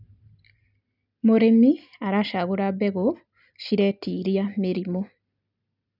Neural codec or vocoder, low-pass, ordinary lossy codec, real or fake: none; 5.4 kHz; none; real